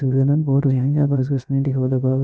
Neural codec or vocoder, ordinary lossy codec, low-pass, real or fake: codec, 16 kHz, about 1 kbps, DyCAST, with the encoder's durations; none; none; fake